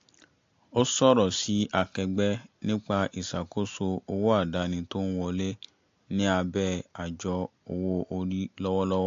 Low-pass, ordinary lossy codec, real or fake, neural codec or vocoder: 7.2 kHz; AAC, 48 kbps; real; none